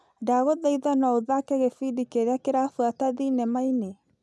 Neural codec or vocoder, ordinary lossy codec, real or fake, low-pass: none; none; real; none